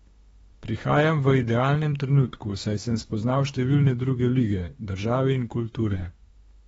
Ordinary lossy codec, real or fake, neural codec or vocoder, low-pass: AAC, 24 kbps; fake; autoencoder, 48 kHz, 32 numbers a frame, DAC-VAE, trained on Japanese speech; 19.8 kHz